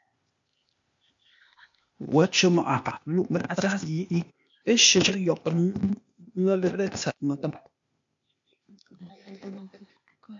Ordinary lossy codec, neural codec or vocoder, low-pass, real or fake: MP3, 48 kbps; codec, 16 kHz, 0.8 kbps, ZipCodec; 7.2 kHz; fake